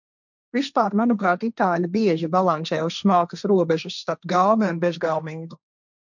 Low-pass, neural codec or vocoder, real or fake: 7.2 kHz; codec, 16 kHz, 1.1 kbps, Voila-Tokenizer; fake